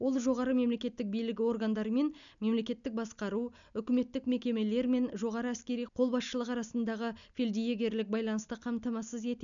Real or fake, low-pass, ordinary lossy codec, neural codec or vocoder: real; 7.2 kHz; none; none